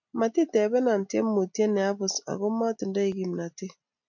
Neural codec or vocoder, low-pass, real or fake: none; 7.2 kHz; real